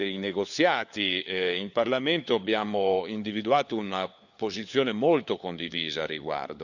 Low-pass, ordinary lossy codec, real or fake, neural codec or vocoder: 7.2 kHz; none; fake; codec, 16 kHz, 4 kbps, FunCodec, trained on LibriTTS, 50 frames a second